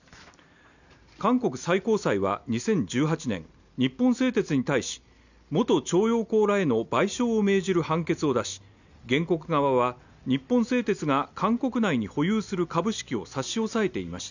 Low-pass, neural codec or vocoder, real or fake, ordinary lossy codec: 7.2 kHz; none; real; none